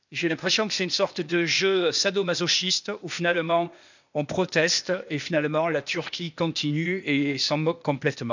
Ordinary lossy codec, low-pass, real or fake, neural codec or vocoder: none; 7.2 kHz; fake; codec, 16 kHz, 0.8 kbps, ZipCodec